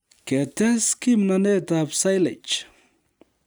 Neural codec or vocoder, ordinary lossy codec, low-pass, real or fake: none; none; none; real